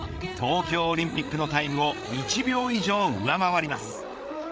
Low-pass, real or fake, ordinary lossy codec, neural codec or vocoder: none; fake; none; codec, 16 kHz, 8 kbps, FreqCodec, larger model